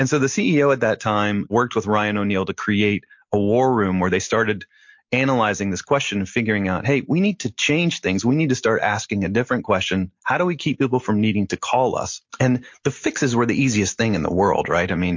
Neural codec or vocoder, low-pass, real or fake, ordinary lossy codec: none; 7.2 kHz; real; MP3, 48 kbps